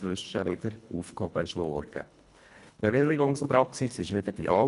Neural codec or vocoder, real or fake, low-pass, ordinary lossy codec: codec, 24 kHz, 1.5 kbps, HILCodec; fake; 10.8 kHz; none